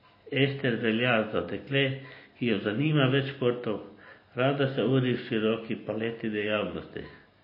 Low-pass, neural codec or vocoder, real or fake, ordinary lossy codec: 5.4 kHz; none; real; MP3, 24 kbps